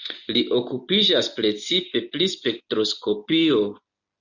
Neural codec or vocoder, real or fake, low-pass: none; real; 7.2 kHz